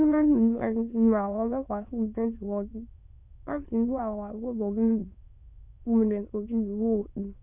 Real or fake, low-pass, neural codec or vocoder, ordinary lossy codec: fake; 3.6 kHz; autoencoder, 22.05 kHz, a latent of 192 numbers a frame, VITS, trained on many speakers; none